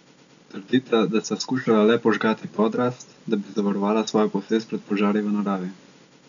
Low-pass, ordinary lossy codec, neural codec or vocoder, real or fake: 7.2 kHz; none; none; real